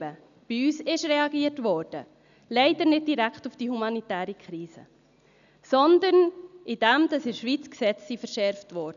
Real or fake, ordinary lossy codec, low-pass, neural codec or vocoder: real; none; 7.2 kHz; none